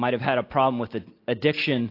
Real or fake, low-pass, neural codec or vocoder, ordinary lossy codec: real; 5.4 kHz; none; AAC, 32 kbps